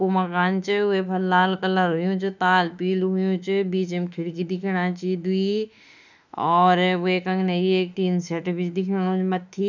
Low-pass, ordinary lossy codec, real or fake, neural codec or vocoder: 7.2 kHz; none; fake; autoencoder, 48 kHz, 32 numbers a frame, DAC-VAE, trained on Japanese speech